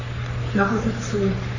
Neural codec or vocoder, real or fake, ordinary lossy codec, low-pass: codec, 44.1 kHz, 3.4 kbps, Pupu-Codec; fake; none; 7.2 kHz